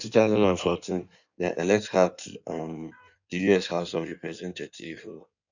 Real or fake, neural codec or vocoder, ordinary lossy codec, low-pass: fake; codec, 16 kHz in and 24 kHz out, 1.1 kbps, FireRedTTS-2 codec; none; 7.2 kHz